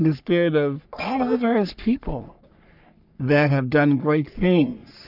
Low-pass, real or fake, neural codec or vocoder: 5.4 kHz; fake; codec, 44.1 kHz, 3.4 kbps, Pupu-Codec